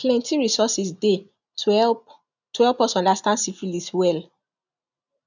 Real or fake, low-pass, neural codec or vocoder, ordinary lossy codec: real; 7.2 kHz; none; none